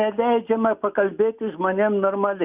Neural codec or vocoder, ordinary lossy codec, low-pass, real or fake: none; Opus, 64 kbps; 3.6 kHz; real